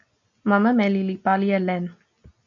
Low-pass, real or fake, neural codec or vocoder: 7.2 kHz; real; none